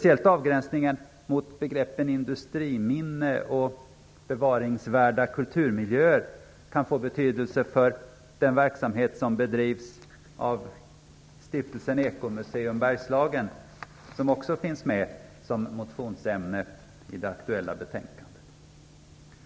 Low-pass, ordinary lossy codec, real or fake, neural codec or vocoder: none; none; real; none